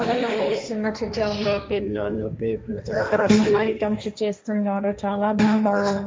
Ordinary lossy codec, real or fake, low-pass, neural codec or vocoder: none; fake; none; codec, 16 kHz, 1.1 kbps, Voila-Tokenizer